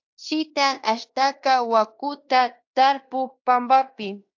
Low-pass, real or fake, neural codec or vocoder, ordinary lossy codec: 7.2 kHz; fake; codec, 16 kHz in and 24 kHz out, 0.9 kbps, LongCat-Audio-Codec, fine tuned four codebook decoder; AAC, 48 kbps